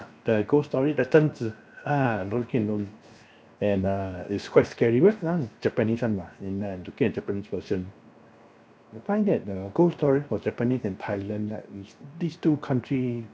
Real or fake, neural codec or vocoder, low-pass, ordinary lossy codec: fake; codec, 16 kHz, 0.7 kbps, FocalCodec; none; none